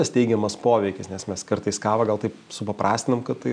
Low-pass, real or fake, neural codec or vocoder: 9.9 kHz; real; none